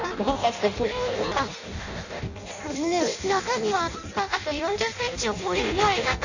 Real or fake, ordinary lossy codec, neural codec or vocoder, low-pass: fake; none; codec, 16 kHz in and 24 kHz out, 0.6 kbps, FireRedTTS-2 codec; 7.2 kHz